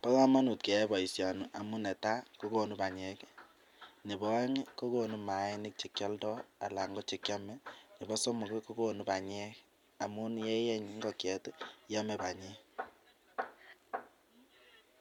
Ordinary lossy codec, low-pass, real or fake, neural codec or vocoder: MP3, 96 kbps; 19.8 kHz; real; none